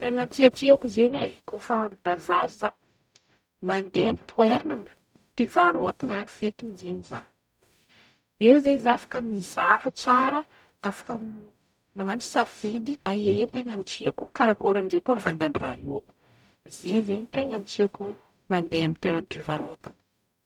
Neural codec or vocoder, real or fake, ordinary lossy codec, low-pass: codec, 44.1 kHz, 0.9 kbps, DAC; fake; none; 19.8 kHz